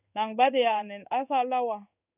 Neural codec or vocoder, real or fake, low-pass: codec, 16 kHz in and 24 kHz out, 1 kbps, XY-Tokenizer; fake; 3.6 kHz